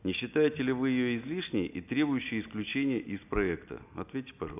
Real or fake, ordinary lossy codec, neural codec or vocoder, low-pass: real; none; none; 3.6 kHz